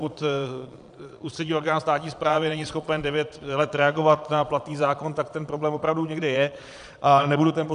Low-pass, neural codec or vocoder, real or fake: 9.9 kHz; vocoder, 22.05 kHz, 80 mel bands, Vocos; fake